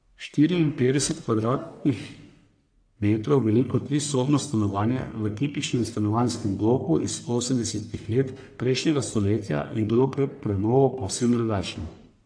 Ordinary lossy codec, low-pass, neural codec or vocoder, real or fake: AAC, 64 kbps; 9.9 kHz; codec, 44.1 kHz, 1.7 kbps, Pupu-Codec; fake